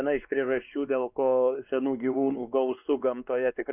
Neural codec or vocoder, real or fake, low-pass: codec, 16 kHz, 2 kbps, X-Codec, WavLM features, trained on Multilingual LibriSpeech; fake; 3.6 kHz